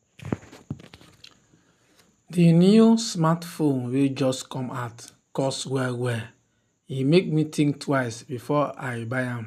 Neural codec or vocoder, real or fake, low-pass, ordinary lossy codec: none; real; 14.4 kHz; none